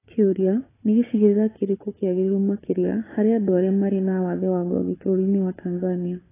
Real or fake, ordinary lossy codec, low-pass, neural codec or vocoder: fake; AAC, 16 kbps; 3.6 kHz; codec, 16 kHz, 4 kbps, FunCodec, trained on Chinese and English, 50 frames a second